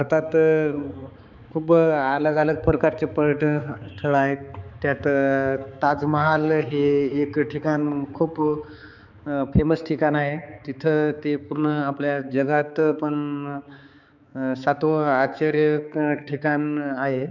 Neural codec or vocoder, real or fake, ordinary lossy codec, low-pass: codec, 16 kHz, 4 kbps, X-Codec, HuBERT features, trained on balanced general audio; fake; none; 7.2 kHz